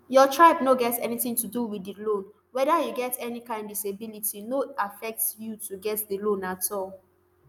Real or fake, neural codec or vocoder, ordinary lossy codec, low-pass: real; none; none; none